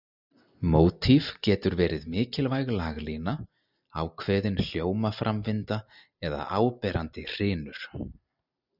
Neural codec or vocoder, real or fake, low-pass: none; real; 5.4 kHz